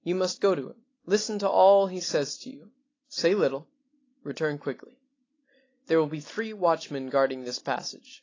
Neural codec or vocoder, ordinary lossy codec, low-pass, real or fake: none; AAC, 32 kbps; 7.2 kHz; real